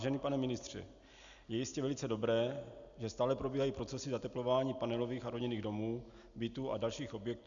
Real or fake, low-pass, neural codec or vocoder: real; 7.2 kHz; none